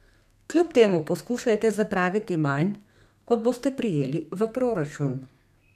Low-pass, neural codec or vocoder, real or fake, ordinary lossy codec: 14.4 kHz; codec, 32 kHz, 1.9 kbps, SNAC; fake; none